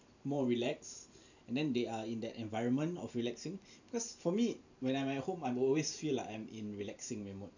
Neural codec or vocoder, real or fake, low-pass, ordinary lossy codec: vocoder, 44.1 kHz, 128 mel bands every 512 samples, BigVGAN v2; fake; 7.2 kHz; none